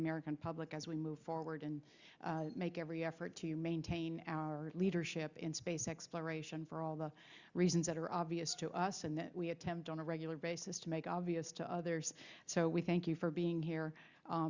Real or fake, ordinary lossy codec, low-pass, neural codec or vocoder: real; Opus, 64 kbps; 7.2 kHz; none